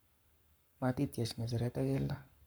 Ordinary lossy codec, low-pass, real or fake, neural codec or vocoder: none; none; fake; codec, 44.1 kHz, 7.8 kbps, Pupu-Codec